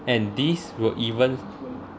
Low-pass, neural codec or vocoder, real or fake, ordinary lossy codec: none; none; real; none